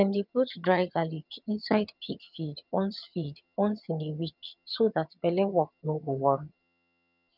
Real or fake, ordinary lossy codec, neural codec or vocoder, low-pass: fake; none; vocoder, 22.05 kHz, 80 mel bands, HiFi-GAN; 5.4 kHz